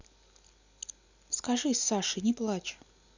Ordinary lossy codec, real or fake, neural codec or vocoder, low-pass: none; real; none; 7.2 kHz